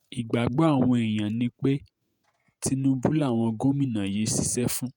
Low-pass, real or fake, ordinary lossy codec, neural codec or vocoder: none; fake; none; vocoder, 48 kHz, 128 mel bands, Vocos